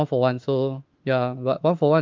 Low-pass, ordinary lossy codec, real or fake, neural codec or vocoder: 7.2 kHz; Opus, 24 kbps; fake; autoencoder, 48 kHz, 32 numbers a frame, DAC-VAE, trained on Japanese speech